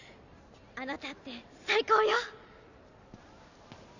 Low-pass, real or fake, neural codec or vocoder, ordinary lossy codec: 7.2 kHz; real; none; none